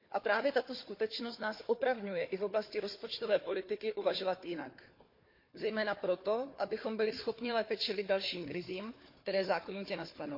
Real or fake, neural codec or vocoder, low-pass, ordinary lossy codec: fake; codec, 16 kHz, 4 kbps, FunCodec, trained on Chinese and English, 50 frames a second; 5.4 kHz; MP3, 32 kbps